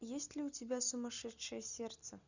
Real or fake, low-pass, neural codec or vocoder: real; 7.2 kHz; none